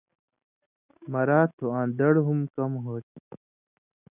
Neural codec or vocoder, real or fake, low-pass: none; real; 3.6 kHz